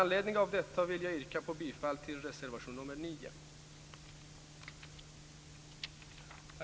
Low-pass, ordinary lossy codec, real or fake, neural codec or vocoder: none; none; real; none